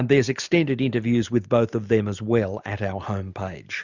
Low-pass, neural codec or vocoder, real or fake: 7.2 kHz; none; real